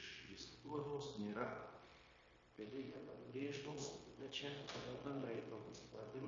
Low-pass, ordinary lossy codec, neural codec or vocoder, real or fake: 7.2 kHz; MP3, 32 kbps; codec, 16 kHz, 0.9 kbps, LongCat-Audio-Codec; fake